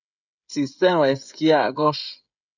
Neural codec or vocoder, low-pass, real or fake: codec, 16 kHz, 16 kbps, FreqCodec, smaller model; 7.2 kHz; fake